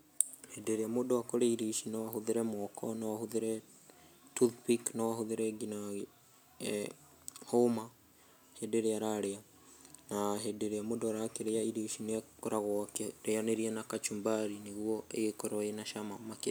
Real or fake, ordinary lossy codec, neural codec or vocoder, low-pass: real; none; none; none